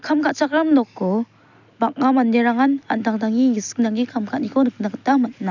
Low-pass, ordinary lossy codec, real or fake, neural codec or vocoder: 7.2 kHz; none; real; none